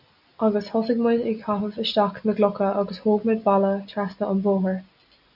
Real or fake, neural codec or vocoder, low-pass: real; none; 5.4 kHz